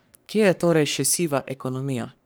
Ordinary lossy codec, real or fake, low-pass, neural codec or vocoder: none; fake; none; codec, 44.1 kHz, 3.4 kbps, Pupu-Codec